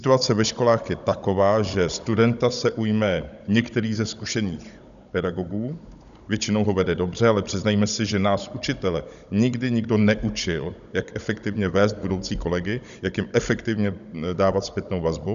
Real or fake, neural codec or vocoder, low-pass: fake; codec, 16 kHz, 16 kbps, FunCodec, trained on Chinese and English, 50 frames a second; 7.2 kHz